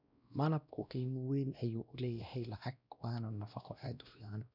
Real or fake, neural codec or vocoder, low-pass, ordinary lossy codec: fake; codec, 16 kHz, 1 kbps, X-Codec, WavLM features, trained on Multilingual LibriSpeech; 5.4 kHz; none